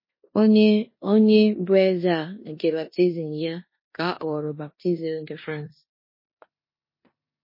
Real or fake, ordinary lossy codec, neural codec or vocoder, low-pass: fake; MP3, 24 kbps; codec, 16 kHz in and 24 kHz out, 0.9 kbps, LongCat-Audio-Codec, four codebook decoder; 5.4 kHz